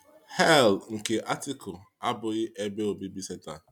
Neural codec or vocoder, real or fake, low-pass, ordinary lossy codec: none; real; 19.8 kHz; none